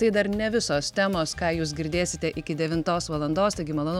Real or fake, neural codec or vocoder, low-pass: real; none; 19.8 kHz